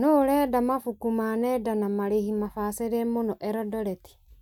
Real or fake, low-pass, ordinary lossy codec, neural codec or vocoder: real; 19.8 kHz; none; none